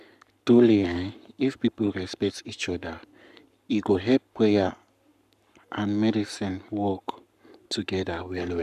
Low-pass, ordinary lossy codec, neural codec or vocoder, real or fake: 14.4 kHz; none; codec, 44.1 kHz, 7.8 kbps, Pupu-Codec; fake